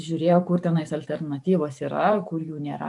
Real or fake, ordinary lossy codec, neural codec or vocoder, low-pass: fake; MP3, 96 kbps; vocoder, 44.1 kHz, 128 mel bands every 512 samples, BigVGAN v2; 10.8 kHz